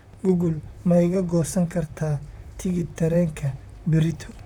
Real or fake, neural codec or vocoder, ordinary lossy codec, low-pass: fake; vocoder, 44.1 kHz, 128 mel bands, Pupu-Vocoder; none; 19.8 kHz